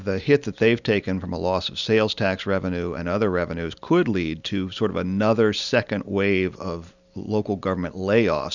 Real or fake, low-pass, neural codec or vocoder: real; 7.2 kHz; none